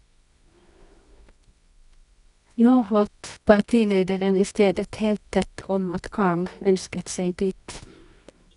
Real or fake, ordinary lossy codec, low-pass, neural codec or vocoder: fake; none; 10.8 kHz; codec, 24 kHz, 0.9 kbps, WavTokenizer, medium music audio release